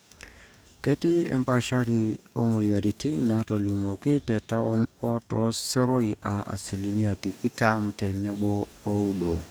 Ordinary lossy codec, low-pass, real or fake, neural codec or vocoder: none; none; fake; codec, 44.1 kHz, 2.6 kbps, DAC